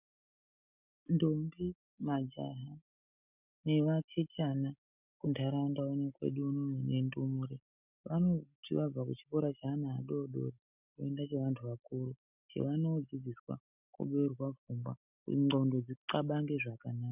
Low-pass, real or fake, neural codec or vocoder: 3.6 kHz; real; none